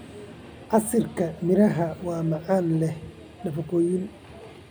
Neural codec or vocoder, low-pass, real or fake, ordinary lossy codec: none; none; real; none